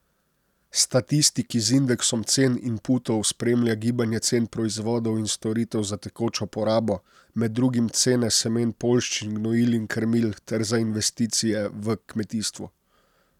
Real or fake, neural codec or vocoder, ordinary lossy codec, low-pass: real; none; none; 19.8 kHz